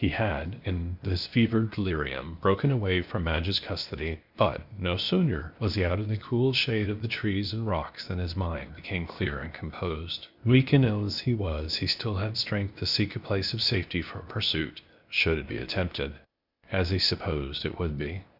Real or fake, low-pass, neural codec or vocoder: fake; 5.4 kHz; codec, 16 kHz, 0.8 kbps, ZipCodec